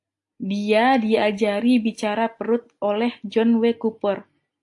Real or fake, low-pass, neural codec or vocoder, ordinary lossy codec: real; 10.8 kHz; none; AAC, 64 kbps